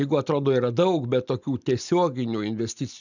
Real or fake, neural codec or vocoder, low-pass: real; none; 7.2 kHz